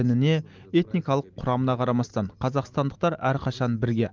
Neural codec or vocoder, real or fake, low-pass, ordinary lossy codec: none; real; 7.2 kHz; Opus, 24 kbps